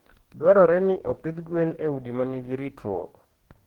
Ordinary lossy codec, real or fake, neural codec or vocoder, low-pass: Opus, 16 kbps; fake; codec, 44.1 kHz, 2.6 kbps, DAC; 19.8 kHz